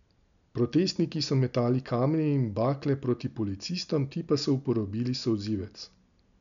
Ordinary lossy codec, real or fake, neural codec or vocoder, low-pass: none; real; none; 7.2 kHz